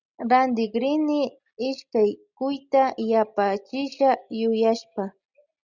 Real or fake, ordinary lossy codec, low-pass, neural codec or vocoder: real; Opus, 64 kbps; 7.2 kHz; none